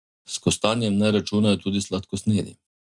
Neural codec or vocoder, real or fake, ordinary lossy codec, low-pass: none; real; none; 10.8 kHz